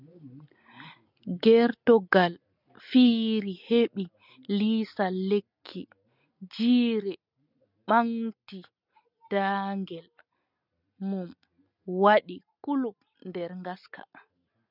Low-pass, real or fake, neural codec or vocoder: 5.4 kHz; real; none